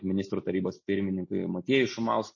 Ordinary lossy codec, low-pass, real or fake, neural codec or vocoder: MP3, 32 kbps; 7.2 kHz; real; none